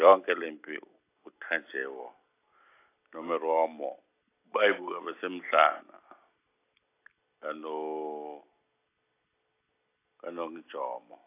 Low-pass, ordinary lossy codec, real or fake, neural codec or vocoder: 3.6 kHz; AAC, 24 kbps; real; none